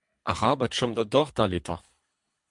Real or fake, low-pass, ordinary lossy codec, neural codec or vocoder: fake; 10.8 kHz; MP3, 64 kbps; codec, 24 kHz, 3 kbps, HILCodec